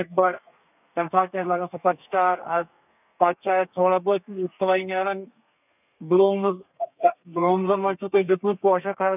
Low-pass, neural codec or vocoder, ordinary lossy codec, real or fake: 3.6 kHz; codec, 32 kHz, 1.9 kbps, SNAC; none; fake